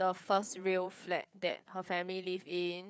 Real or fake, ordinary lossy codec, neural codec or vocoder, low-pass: fake; none; codec, 16 kHz, 4 kbps, FreqCodec, larger model; none